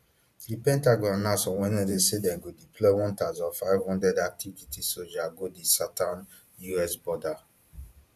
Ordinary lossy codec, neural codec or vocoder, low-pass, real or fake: none; vocoder, 44.1 kHz, 128 mel bands every 512 samples, BigVGAN v2; 14.4 kHz; fake